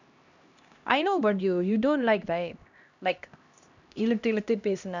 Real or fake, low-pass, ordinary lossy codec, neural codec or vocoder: fake; 7.2 kHz; none; codec, 16 kHz, 1 kbps, X-Codec, HuBERT features, trained on LibriSpeech